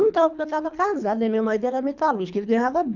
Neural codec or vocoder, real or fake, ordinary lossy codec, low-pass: codec, 24 kHz, 3 kbps, HILCodec; fake; none; 7.2 kHz